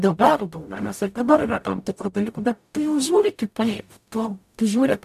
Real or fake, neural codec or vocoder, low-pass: fake; codec, 44.1 kHz, 0.9 kbps, DAC; 14.4 kHz